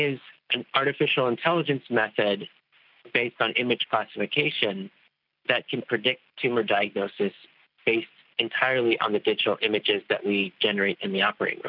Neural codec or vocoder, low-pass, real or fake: none; 5.4 kHz; real